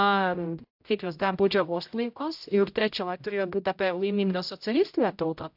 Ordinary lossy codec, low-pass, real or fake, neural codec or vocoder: AAC, 48 kbps; 5.4 kHz; fake; codec, 16 kHz, 0.5 kbps, X-Codec, HuBERT features, trained on general audio